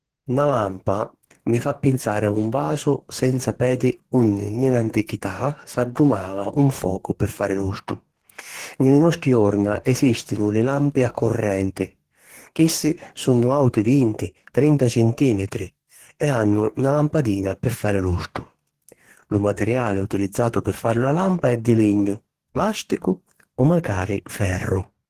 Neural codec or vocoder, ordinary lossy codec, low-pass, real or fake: codec, 44.1 kHz, 2.6 kbps, DAC; Opus, 16 kbps; 14.4 kHz; fake